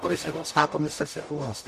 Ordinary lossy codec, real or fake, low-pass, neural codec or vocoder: MP3, 64 kbps; fake; 14.4 kHz; codec, 44.1 kHz, 0.9 kbps, DAC